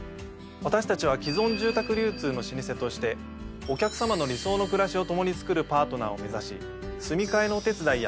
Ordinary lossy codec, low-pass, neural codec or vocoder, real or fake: none; none; none; real